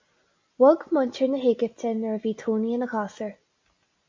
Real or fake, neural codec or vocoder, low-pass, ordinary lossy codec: real; none; 7.2 kHz; MP3, 64 kbps